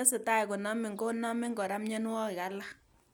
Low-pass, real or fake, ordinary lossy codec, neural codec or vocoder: none; real; none; none